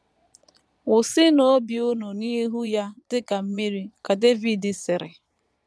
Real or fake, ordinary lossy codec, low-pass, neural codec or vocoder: fake; none; none; vocoder, 22.05 kHz, 80 mel bands, Vocos